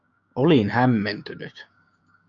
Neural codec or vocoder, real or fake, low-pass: codec, 16 kHz, 6 kbps, DAC; fake; 7.2 kHz